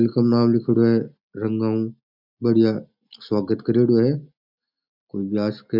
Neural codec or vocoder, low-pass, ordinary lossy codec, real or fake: none; 5.4 kHz; none; real